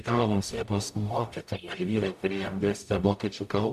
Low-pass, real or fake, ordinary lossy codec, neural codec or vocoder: 14.4 kHz; fake; AAC, 96 kbps; codec, 44.1 kHz, 0.9 kbps, DAC